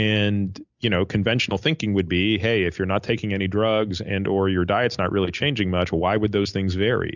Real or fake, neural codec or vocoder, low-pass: real; none; 7.2 kHz